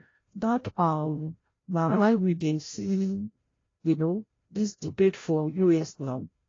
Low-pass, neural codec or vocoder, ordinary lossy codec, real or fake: 7.2 kHz; codec, 16 kHz, 0.5 kbps, FreqCodec, larger model; AAC, 32 kbps; fake